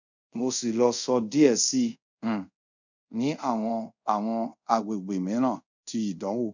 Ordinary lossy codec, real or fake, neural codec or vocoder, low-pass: none; fake; codec, 24 kHz, 0.5 kbps, DualCodec; 7.2 kHz